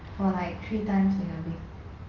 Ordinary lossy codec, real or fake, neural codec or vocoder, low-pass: Opus, 32 kbps; real; none; 7.2 kHz